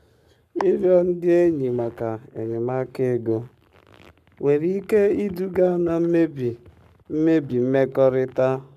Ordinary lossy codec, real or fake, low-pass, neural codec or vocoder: none; fake; 14.4 kHz; codec, 44.1 kHz, 7.8 kbps, Pupu-Codec